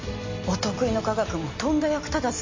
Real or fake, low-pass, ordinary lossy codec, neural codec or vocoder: real; 7.2 kHz; MP3, 32 kbps; none